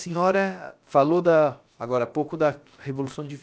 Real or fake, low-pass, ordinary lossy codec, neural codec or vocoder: fake; none; none; codec, 16 kHz, about 1 kbps, DyCAST, with the encoder's durations